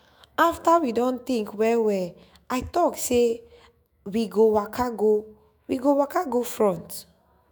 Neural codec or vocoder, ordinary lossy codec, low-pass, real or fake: autoencoder, 48 kHz, 128 numbers a frame, DAC-VAE, trained on Japanese speech; none; none; fake